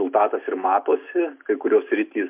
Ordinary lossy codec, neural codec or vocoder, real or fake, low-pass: MP3, 32 kbps; none; real; 3.6 kHz